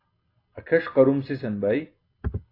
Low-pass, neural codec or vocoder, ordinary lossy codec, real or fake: 5.4 kHz; none; AAC, 32 kbps; real